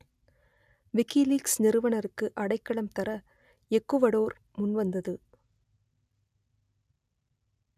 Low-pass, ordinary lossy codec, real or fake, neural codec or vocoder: 14.4 kHz; none; real; none